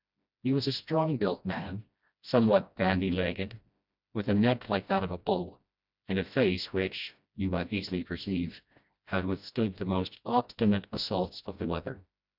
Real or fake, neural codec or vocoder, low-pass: fake; codec, 16 kHz, 1 kbps, FreqCodec, smaller model; 5.4 kHz